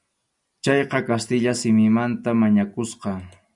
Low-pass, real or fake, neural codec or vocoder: 10.8 kHz; real; none